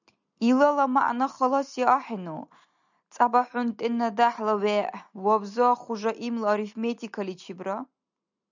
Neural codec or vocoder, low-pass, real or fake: none; 7.2 kHz; real